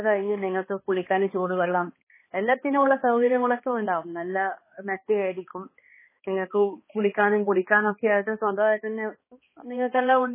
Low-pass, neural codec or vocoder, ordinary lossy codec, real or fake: 3.6 kHz; codec, 16 kHz, 2 kbps, X-Codec, HuBERT features, trained on balanced general audio; MP3, 16 kbps; fake